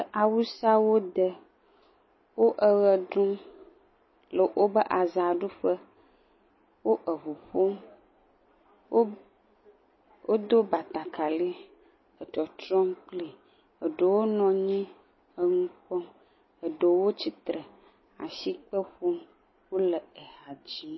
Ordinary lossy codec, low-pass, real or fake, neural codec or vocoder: MP3, 24 kbps; 7.2 kHz; real; none